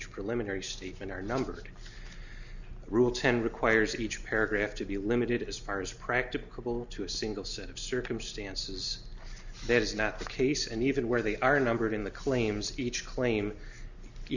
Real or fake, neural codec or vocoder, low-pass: real; none; 7.2 kHz